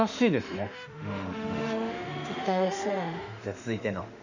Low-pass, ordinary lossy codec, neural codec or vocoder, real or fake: 7.2 kHz; none; autoencoder, 48 kHz, 32 numbers a frame, DAC-VAE, trained on Japanese speech; fake